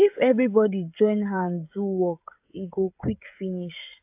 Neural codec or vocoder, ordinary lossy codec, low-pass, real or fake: codec, 16 kHz, 16 kbps, FreqCodec, smaller model; AAC, 32 kbps; 3.6 kHz; fake